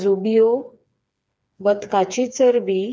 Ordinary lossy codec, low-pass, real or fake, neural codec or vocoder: none; none; fake; codec, 16 kHz, 4 kbps, FreqCodec, smaller model